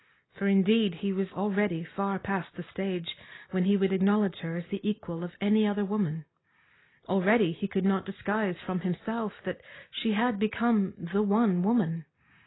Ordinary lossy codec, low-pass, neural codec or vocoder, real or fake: AAC, 16 kbps; 7.2 kHz; none; real